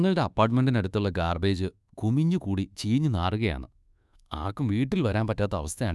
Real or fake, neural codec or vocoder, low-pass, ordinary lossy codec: fake; codec, 24 kHz, 1.2 kbps, DualCodec; none; none